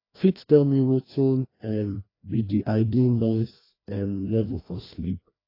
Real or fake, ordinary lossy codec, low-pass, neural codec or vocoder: fake; AAC, 24 kbps; 5.4 kHz; codec, 16 kHz, 1 kbps, FreqCodec, larger model